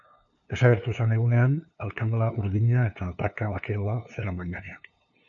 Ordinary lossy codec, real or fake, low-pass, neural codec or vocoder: AAC, 64 kbps; fake; 7.2 kHz; codec, 16 kHz, 2 kbps, FunCodec, trained on LibriTTS, 25 frames a second